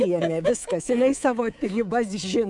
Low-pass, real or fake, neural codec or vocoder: 10.8 kHz; fake; codec, 24 kHz, 3.1 kbps, DualCodec